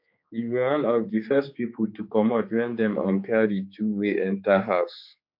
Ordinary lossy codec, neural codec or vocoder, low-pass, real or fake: MP3, 48 kbps; codec, 16 kHz, 4 kbps, X-Codec, HuBERT features, trained on general audio; 5.4 kHz; fake